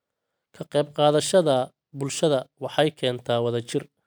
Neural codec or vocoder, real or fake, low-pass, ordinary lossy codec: none; real; none; none